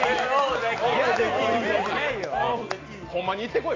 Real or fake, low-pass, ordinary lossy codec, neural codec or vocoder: real; 7.2 kHz; AAC, 32 kbps; none